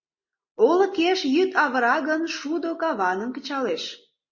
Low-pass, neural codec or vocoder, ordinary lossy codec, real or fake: 7.2 kHz; none; MP3, 32 kbps; real